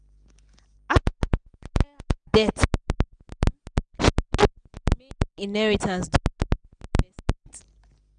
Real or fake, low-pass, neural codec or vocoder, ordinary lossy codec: real; 9.9 kHz; none; none